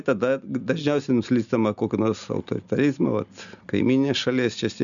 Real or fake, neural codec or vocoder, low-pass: real; none; 7.2 kHz